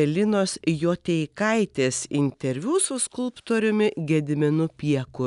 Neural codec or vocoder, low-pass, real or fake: none; 10.8 kHz; real